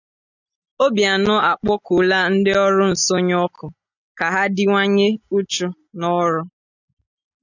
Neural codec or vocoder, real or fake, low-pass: none; real; 7.2 kHz